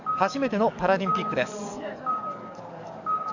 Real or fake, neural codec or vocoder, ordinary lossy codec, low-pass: fake; codec, 44.1 kHz, 7.8 kbps, DAC; none; 7.2 kHz